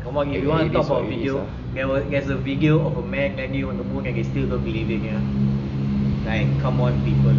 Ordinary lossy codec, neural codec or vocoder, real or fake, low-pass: none; none; real; 7.2 kHz